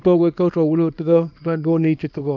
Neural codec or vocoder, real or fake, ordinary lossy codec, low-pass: codec, 24 kHz, 0.9 kbps, WavTokenizer, small release; fake; none; 7.2 kHz